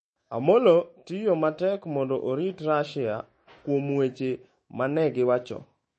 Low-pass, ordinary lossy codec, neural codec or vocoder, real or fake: 10.8 kHz; MP3, 32 kbps; autoencoder, 48 kHz, 128 numbers a frame, DAC-VAE, trained on Japanese speech; fake